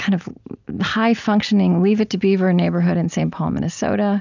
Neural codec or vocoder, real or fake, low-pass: none; real; 7.2 kHz